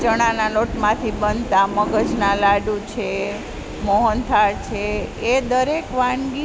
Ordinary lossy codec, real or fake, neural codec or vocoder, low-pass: none; real; none; none